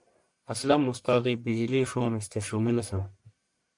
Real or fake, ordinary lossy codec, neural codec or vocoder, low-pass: fake; MP3, 64 kbps; codec, 44.1 kHz, 1.7 kbps, Pupu-Codec; 10.8 kHz